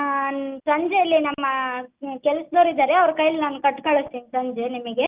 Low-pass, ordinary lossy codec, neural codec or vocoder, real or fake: 3.6 kHz; Opus, 32 kbps; none; real